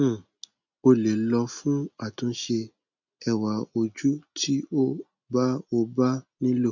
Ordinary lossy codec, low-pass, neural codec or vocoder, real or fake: none; 7.2 kHz; none; real